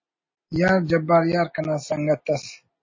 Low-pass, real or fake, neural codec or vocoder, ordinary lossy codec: 7.2 kHz; real; none; MP3, 32 kbps